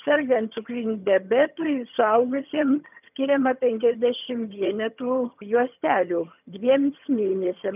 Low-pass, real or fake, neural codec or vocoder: 3.6 kHz; fake; vocoder, 22.05 kHz, 80 mel bands, HiFi-GAN